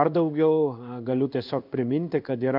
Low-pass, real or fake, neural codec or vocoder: 5.4 kHz; fake; codec, 16 kHz in and 24 kHz out, 1 kbps, XY-Tokenizer